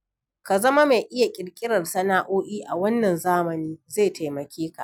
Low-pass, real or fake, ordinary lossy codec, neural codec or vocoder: 19.8 kHz; real; none; none